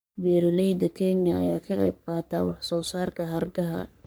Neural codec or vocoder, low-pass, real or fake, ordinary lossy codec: codec, 44.1 kHz, 3.4 kbps, Pupu-Codec; none; fake; none